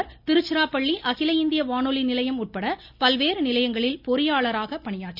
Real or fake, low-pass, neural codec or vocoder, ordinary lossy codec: real; 5.4 kHz; none; none